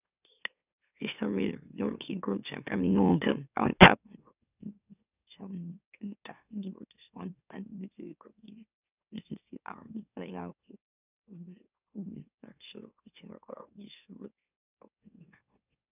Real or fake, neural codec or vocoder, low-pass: fake; autoencoder, 44.1 kHz, a latent of 192 numbers a frame, MeloTTS; 3.6 kHz